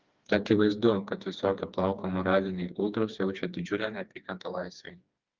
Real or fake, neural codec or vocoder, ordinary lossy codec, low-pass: fake; codec, 16 kHz, 2 kbps, FreqCodec, smaller model; Opus, 24 kbps; 7.2 kHz